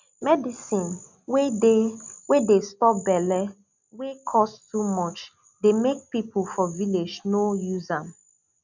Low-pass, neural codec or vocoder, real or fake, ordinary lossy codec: 7.2 kHz; none; real; none